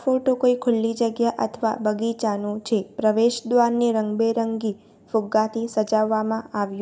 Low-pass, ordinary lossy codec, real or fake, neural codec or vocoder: none; none; real; none